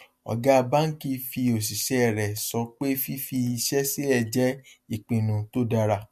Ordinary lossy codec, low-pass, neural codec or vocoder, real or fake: MP3, 64 kbps; 14.4 kHz; none; real